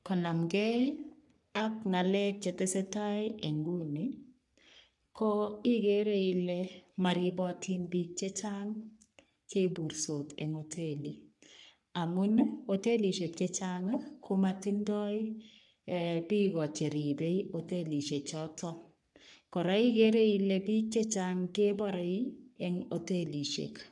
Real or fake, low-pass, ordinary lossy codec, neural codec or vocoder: fake; 10.8 kHz; none; codec, 44.1 kHz, 3.4 kbps, Pupu-Codec